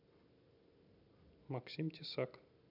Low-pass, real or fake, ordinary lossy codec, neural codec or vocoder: 5.4 kHz; real; none; none